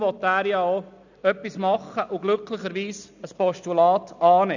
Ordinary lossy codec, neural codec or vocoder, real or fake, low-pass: none; none; real; 7.2 kHz